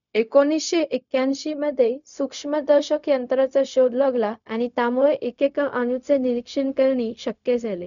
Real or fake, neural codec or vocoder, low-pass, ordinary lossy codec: fake; codec, 16 kHz, 0.4 kbps, LongCat-Audio-Codec; 7.2 kHz; none